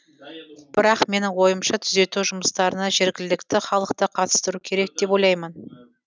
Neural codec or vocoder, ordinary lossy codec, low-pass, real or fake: none; none; none; real